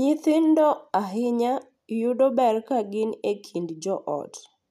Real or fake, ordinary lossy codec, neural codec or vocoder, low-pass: real; none; none; 14.4 kHz